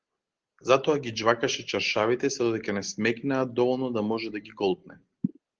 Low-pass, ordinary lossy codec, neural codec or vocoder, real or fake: 7.2 kHz; Opus, 16 kbps; none; real